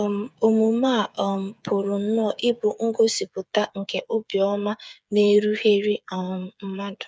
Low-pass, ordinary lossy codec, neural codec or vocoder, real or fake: none; none; codec, 16 kHz, 8 kbps, FreqCodec, smaller model; fake